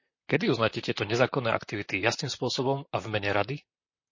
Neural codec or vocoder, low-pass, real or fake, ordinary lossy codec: vocoder, 44.1 kHz, 128 mel bands, Pupu-Vocoder; 7.2 kHz; fake; MP3, 32 kbps